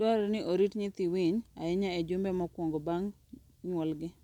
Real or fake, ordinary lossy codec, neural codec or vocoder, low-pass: real; none; none; 19.8 kHz